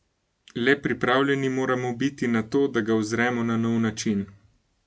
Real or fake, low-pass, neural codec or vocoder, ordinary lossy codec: real; none; none; none